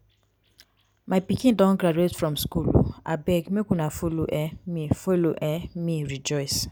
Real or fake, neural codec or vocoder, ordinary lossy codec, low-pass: real; none; none; none